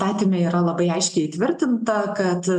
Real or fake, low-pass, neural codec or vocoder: real; 9.9 kHz; none